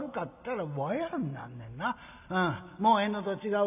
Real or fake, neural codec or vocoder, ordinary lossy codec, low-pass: real; none; none; 3.6 kHz